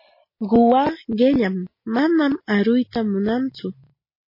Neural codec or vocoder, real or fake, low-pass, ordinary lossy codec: none; real; 5.4 kHz; MP3, 24 kbps